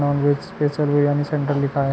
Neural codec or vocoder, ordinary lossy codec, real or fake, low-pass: none; none; real; none